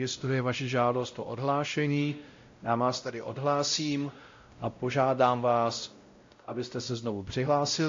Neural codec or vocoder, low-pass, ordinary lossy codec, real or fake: codec, 16 kHz, 0.5 kbps, X-Codec, WavLM features, trained on Multilingual LibriSpeech; 7.2 kHz; AAC, 48 kbps; fake